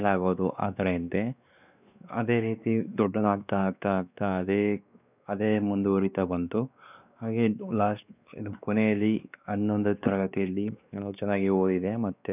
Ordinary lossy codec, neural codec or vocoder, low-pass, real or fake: none; codec, 16 kHz, 2 kbps, X-Codec, WavLM features, trained on Multilingual LibriSpeech; 3.6 kHz; fake